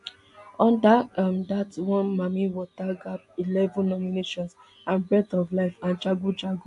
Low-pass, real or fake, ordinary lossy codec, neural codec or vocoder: 10.8 kHz; real; AAC, 64 kbps; none